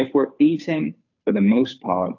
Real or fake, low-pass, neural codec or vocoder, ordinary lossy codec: fake; 7.2 kHz; codec, 16 kHz, 2 kbps, FunCodec, trained on Chinese and English, 25 frames a second; AAC, 48 kbps